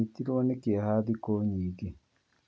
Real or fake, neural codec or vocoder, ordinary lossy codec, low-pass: real; none; none; none